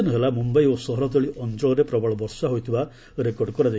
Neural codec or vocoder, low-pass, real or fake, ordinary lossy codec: none; none; real; none